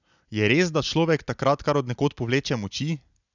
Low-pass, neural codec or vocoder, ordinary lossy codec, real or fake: 7.2 kHz; none; none; real